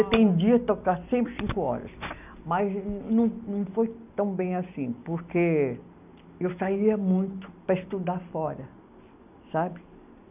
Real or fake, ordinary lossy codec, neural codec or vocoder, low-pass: real; none; none; 3.6 kHz